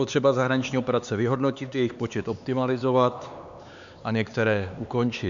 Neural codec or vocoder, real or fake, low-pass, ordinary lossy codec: codec, 16 kHz, 4 kbps, X-Codec, HuBERT features, trained on LibriSpeech; fake; 7.2 kHz; AAC, 64 kbps